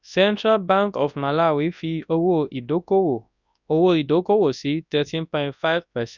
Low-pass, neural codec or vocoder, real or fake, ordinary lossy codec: 7.2 kHz; codec, 24 kHz, 0.9 kbps, WavTokenizer, large speech release; fake; none